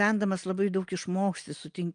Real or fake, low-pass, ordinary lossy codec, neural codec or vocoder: real; 9.9 kHz; Opus, 32 kbps; none